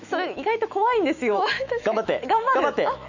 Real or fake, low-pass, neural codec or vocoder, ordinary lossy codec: fake; 7.2 kHz; autoencoder, 48 kHz, 128 numbers a frame, DAC-VAE, trained on Japanese speech; none